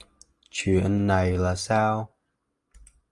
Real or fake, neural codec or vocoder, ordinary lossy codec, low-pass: real; none; Opus, 32 kbps; 10.8 kHz